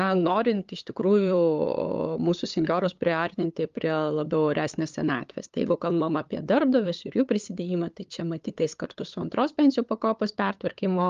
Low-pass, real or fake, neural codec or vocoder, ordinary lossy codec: 7.2 kHz; fake; codec, 16 kHz, 8 kbps, FunCodec, trained on LibriTTS, 25 frames a second; Opus, 32 kbps